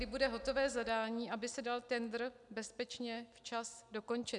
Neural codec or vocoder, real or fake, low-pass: none; real; 10.8 kHz